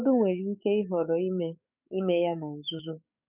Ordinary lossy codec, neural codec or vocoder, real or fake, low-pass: none; codec, 44.1 kHz, 7.8 kbps, DAC; fake; 3.6 kHz